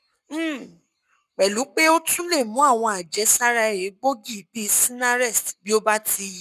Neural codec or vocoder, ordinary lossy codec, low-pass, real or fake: codec, 44.1 kHz, 7.8 kbps, Pupu-Codec; none; 14.4 kHz; fake